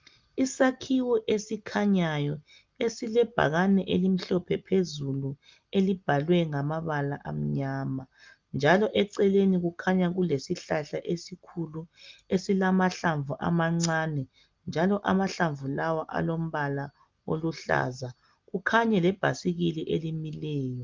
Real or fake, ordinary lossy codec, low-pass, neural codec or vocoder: real; Opus, 24 kbps; 7.2 kHz; none